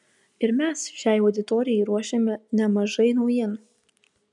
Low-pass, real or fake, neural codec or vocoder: 10.8 kHz; real; none